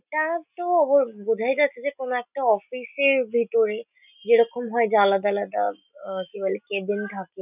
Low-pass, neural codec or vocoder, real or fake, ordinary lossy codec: 3.6 kHz; none; real; none